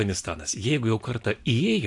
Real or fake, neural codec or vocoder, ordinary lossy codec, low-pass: real; none; AAC, 64 kbps; 10.8 kHz